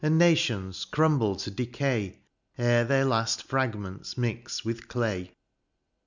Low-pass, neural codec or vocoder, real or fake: 7.2 kHz; none; real